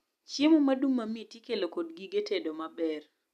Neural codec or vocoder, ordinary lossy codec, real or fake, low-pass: none; none; real; 14.4 kHz